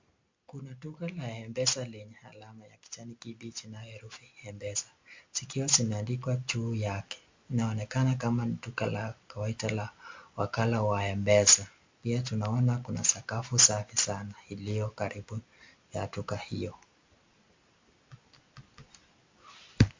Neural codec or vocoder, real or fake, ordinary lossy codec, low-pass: none; real; MP3, 48 kbps; 7.2 kHz